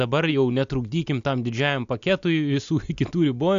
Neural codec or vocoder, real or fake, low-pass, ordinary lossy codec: none; real; 7.2 kHz; AAC, 64 kbps